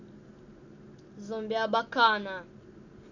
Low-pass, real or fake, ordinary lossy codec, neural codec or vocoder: 7.2 kHz; real; none; none